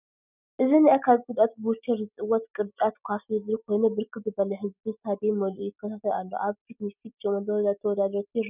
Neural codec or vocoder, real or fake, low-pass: none; real; 3.6 kHz